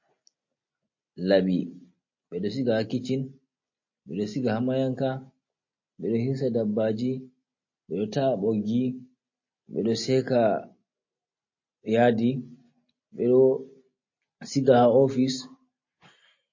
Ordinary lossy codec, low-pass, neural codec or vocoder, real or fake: MP3, 32 kbps; 7.2 kHz; none; real